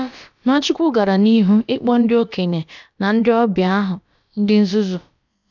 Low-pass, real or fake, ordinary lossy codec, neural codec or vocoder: 7.2 kHz; fake; none; codec, 16 kHz, about 1 kbps, DyCAST, with the encoder's durations